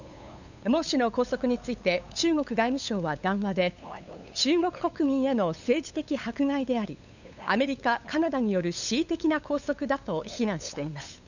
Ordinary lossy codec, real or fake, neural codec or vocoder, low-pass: none; fake; codec, 16 kHz, 8 kbps, FunCodec, trained on LibriTTS, 25 frames a second; 7.2 kHz